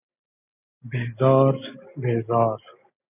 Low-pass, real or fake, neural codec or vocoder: 3.6 kHz; real; none